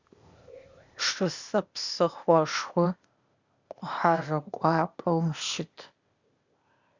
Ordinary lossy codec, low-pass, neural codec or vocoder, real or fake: Opus, 64 kbps; 7.2 kHz; codec, 16 kHz, 0.8 kbps, ZipCodec; fake